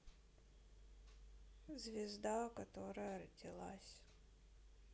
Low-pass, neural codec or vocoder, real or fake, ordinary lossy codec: none; none; real; none